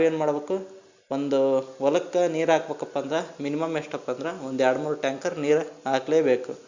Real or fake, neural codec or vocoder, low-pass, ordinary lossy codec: real; none; 7.2 kHz; Opus, 64 kbps